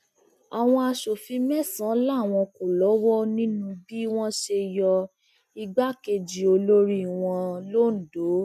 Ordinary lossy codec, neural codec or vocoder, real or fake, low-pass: none; none; real; 14.4 kHz